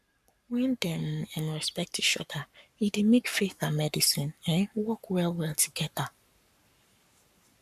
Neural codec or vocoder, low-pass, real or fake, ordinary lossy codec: codec, 44.1 kHz, 7.8 kbps, Pupu-Codec; 14.4 kHz; fake; none